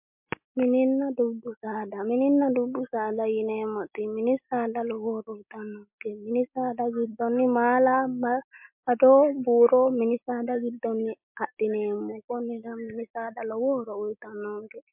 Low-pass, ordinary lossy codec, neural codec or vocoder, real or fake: 3.6 kHz; MP3, 32 kbps; none; real